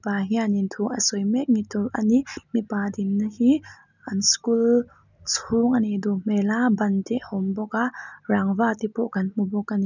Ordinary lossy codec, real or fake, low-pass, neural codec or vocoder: none; real; 7.2 kHz; none